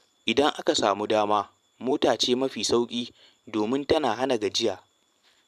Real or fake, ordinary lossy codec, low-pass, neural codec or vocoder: fake; none; 14.4 kHz; vocoder, 44.1 kHz, 128 mel bands every 512 samples, BigVGAN v2